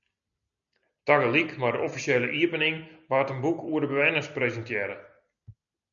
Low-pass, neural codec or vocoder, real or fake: 7.2 kHz; none; real